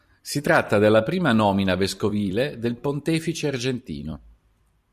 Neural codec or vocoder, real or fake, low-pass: vocoder, 44.1 kHz, 128 mel bands every 256 samples, BigVGAN v2; fake; 14.4 kHz